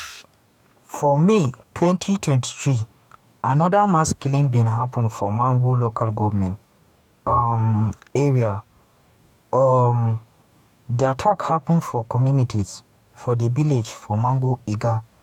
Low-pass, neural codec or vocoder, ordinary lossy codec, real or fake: 19.8 kHz; codec, 44.1 kHz, 2.6 kbps, DAC; none; fake